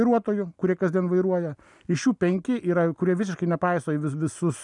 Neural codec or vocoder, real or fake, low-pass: none; real; 10.8 kHz